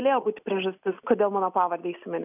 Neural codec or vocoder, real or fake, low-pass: none; real; 3.6 kHz